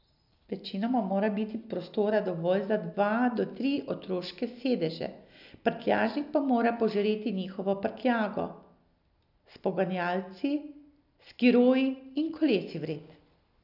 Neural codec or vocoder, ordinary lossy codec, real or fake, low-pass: none; none; real; 5.4 kHz